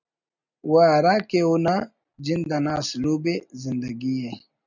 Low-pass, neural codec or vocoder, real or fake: 7.2 kHz; none; real